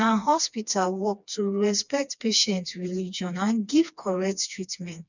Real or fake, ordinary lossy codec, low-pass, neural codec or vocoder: fake; none; 7.2 kHz; codec, 16 kHz, 2 kbps, FreqCodec, smaller model